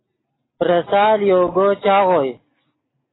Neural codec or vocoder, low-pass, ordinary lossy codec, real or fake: none; 7.2 kHz; AAC, 16 kbps; real